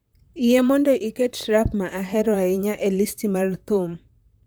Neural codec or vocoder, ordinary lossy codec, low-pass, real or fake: vocoder, 44.1 kHz, 128 mel bands, Pupu-Vocoder; none; none; fake